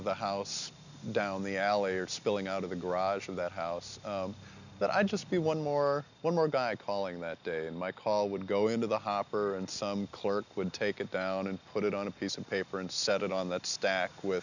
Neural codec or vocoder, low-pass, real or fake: none; 7.2 kHz; real